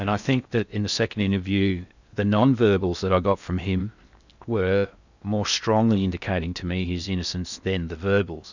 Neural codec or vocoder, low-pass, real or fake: codec, 16 kHz in and 24 kHz out, 0.8 kbps, FocalCodec, streaming, 65536 codes; 7.2 kHz; fake